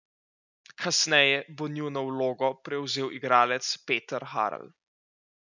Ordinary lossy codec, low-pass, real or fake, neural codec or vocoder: none; 7.2 kHz; real; none